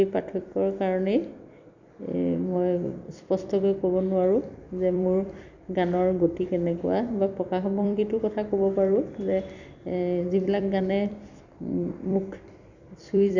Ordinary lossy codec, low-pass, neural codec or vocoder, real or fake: none; 7.2 kHz; vocoder, 44.1 kHz, 128 mel bands every 256 samples, BigVGAN v2; fake